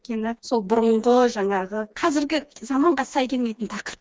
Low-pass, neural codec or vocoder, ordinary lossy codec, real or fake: none; codec, 16 kHz, 2 kbps, FreqCodec, smaller model; none; fake